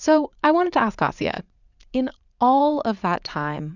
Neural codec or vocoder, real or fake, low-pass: vocoder, 44.1 kHz, 80 mel bands, Vocos; fake; 7.2 kHz